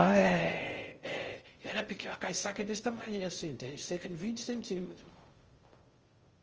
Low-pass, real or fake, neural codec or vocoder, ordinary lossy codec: 7.2 kHz; fake; codec, 16 kHz in and 24 kHz out, 0.6 kbps, FocalCodec, streaming, 2048 codes; Opus, 24 kbps